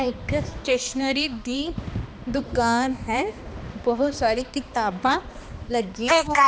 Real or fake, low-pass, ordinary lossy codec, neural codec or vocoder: fake; none; none; codec, 16 kHz, 2 kbps, X-Codec, HuBERT features, trained on balanced general audio